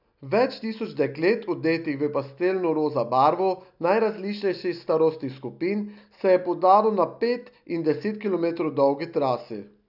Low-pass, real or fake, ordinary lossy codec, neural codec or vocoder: 5.4 kHz; real; none; none